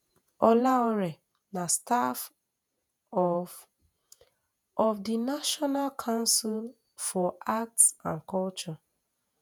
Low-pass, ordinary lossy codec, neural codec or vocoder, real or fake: none; none; vocoder, 48 kHz, 128 mel bands, Vocos; fake